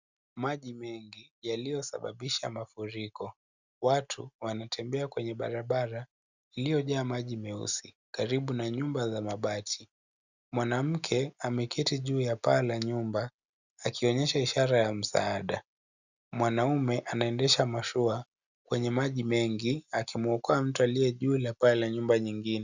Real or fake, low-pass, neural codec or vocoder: real; 7.2 kHz; none